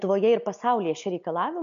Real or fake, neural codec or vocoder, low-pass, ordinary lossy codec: real; none; 7.2 kHz; MP3, 96 kbps